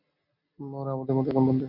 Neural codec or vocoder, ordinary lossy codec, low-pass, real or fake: none; MP3, 32 kbps; 5.4 kHz; real